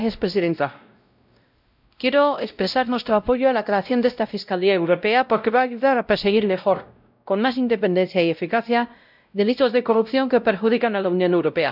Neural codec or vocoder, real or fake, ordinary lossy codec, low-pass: codec, 16 kHz, 0.5 kbps, X-Codec, WavLM features, trained on Multilingual LibriSpeech; fake; none; 5.4 kHz